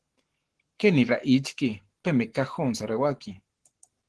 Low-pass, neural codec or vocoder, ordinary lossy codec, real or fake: 10.8 kHz; codec, 44.1 kHz, 7.8 kbps, Pupu-Codec; Opus, 16 kbps; fake